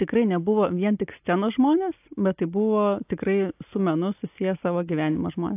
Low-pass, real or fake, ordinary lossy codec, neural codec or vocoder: 3.6 kHz; real; AAC, 32 kbps; none